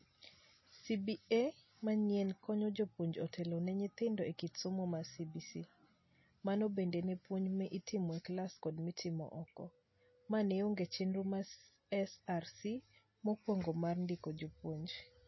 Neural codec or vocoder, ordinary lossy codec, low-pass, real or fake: none; MP3, 24 kbps; 7.2 kHz; real